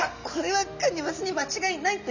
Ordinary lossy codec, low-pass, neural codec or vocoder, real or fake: none; 7.2 kHz; none; real